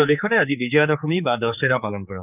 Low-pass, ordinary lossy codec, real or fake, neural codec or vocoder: 3.6 kHz; none; fake; codec, 16 kHz, 4 kbps, X-Codec, HuBERT features, trained on general audio